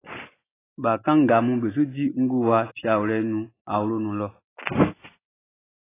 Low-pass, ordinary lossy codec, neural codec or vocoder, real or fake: 3.6 kHz; AAC, 16 kbps; none; real